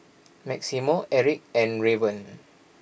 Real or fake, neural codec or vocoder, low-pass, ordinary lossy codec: real; none; none; none